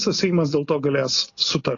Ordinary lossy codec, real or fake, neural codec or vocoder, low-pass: AAC, 32 kbps; real; none; 7.2 kHz